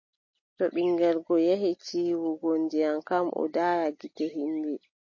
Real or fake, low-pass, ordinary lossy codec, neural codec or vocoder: real; 7.2 kHz; MP3, 32 kbps; none